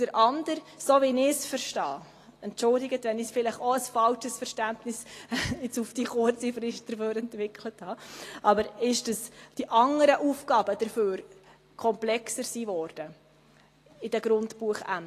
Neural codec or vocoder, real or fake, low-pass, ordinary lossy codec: none; real; 14.4 kHz; AAC, 48 kbps